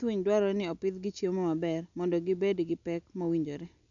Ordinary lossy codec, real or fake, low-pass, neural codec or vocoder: none; real; 7.2 kHz; none